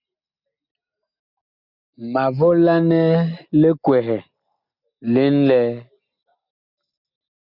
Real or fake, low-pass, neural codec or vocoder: real; 5.4 kHz; none